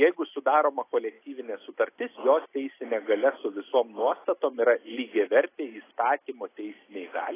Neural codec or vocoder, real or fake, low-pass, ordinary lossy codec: none; real; 3.6 kHz; AAC, 16 kbps